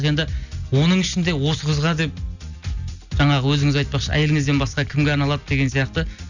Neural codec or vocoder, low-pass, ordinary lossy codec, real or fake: none; 7.2 kHz; none; real